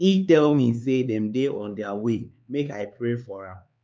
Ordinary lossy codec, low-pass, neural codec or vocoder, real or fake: none; none; codec, 16 kHz, 4 kbps, X-Codec, HuBERT features, trained on LibriSpeech; fake